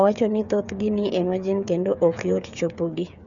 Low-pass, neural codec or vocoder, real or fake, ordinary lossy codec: 7.2 kHz; codec, 16 kHz, 8 kbps, FreqCodec, smaller model; fake; none